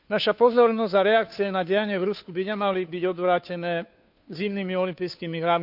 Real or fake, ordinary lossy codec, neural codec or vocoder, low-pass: fake; none; codec, 16 kHz, 2 kbps, FunCodec, trained on Chinese and English, 25 frames a second; 5.4 kHz